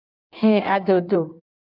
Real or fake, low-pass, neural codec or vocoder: fake; 5.4 kHz; codec, 16 kHz in and 24 kHz out, 1.1 kbps, FireRedTTS-2 codec